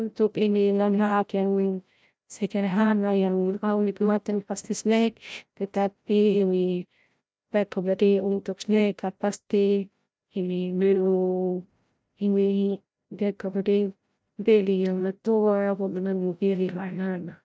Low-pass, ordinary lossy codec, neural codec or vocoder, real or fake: none; none; codec, 16 kHz, 0.5 kbps, FreqCodec, larger model; fake